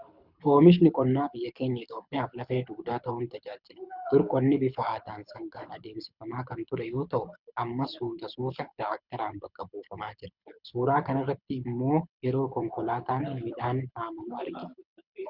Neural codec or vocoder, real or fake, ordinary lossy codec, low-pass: codec, 24 kHz, 6 kbps, HILCodec; fake; Opus, 64 kbps; 5.4 kHz